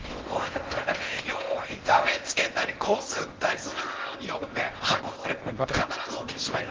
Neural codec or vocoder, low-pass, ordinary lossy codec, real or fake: codec, 16 kHz in and 24 kHz out, 0.6 kbps, FocalCodec, streaming, 4096 codes; 7.2 kHz; Opus, 16 kbps; fake